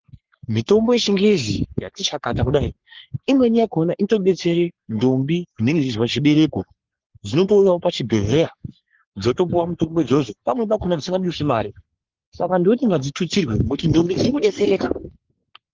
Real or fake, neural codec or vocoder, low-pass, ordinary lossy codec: fake; codec, 44.1 kHz, 3.4 kbps, Pupu-Codec; 7.2 kHz; Opus, 16 kbps